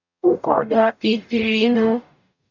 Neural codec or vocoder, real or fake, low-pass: codec, 44.1 kHz, 0.9 kbps, DAC; fake; 7.2 kHz